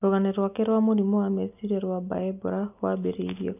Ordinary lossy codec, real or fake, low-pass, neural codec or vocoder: none; real; 3.6 kHz; none